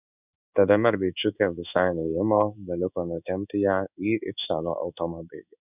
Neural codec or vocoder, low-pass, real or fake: codec, 16 kHz in and 24 kHz out, 1 kbps, XY-Tokenizer; 3.6 kHz; fake